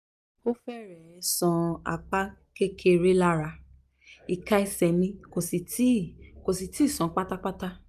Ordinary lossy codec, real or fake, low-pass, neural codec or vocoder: none; real; 14.4 kHz; none